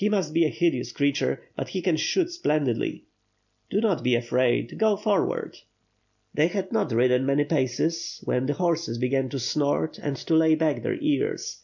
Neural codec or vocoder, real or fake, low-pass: none; real; 7.2 kHz